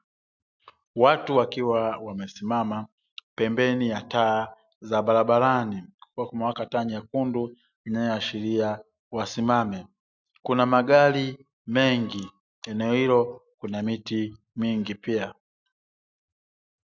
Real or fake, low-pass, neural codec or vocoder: real; 7.2 kHz; none